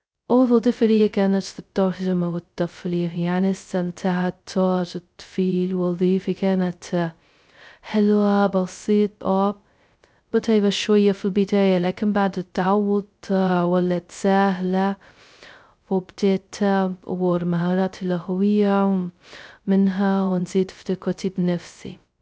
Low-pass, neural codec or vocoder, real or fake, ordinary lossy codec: none; codec, 16 kHz, 0.2 kbps, FocalCodec; fake; none